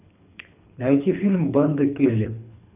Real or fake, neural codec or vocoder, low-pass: fake; codec, 24 kHz, 3 kbps, HILCodec; 3.6 kHz